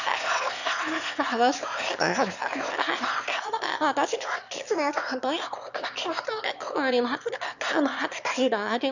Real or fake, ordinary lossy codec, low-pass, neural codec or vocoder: fake; none; 7.2 kHz; autoencoder, 22.05 kHz, a latent of 192 numbers a frame, VITS, trained on one speaker